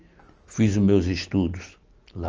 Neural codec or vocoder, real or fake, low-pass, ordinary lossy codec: none; real; 7.2 kHz; Opus, 24 kbps